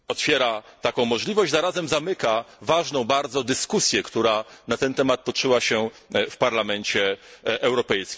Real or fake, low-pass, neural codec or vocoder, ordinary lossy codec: real; none; none; none